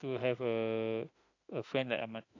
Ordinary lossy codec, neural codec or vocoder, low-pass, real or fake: AAC, 48 kbps; autoencoder, 48 kHz, 32 numbers a frame, DAC-VAE, trained on Japanese speech; 7.2 kHz; fake